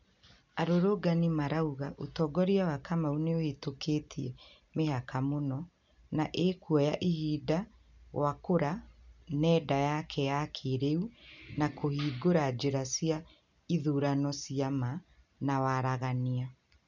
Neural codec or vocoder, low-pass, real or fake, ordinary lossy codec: none; 7.2 kHz; real; none